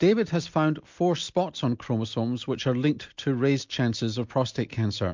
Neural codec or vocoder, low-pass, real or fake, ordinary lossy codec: none; 7.2 kHz; real; MP3, 64 kbps